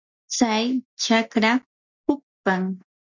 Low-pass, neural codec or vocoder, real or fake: 7.2 kHz; none; real